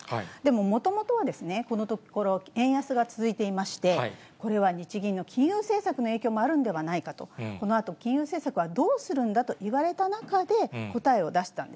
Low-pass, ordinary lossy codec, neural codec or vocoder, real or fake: none; none; none; real